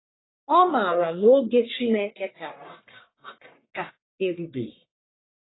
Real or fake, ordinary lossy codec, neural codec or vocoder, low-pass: fake; AAC, 16 kbps; codec, 44.1 kHz, 1.7 kbps, Pupu-Codec; 7.2 kHz